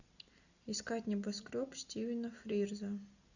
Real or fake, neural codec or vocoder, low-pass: real; none; 7.2 kHz